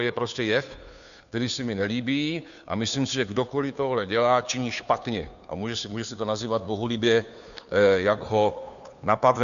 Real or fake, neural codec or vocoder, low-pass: fake; codec, 16 kHz, 2 kbps, FunCodec, trained on Chinese and English, 25 frames a second; 7.2 kHz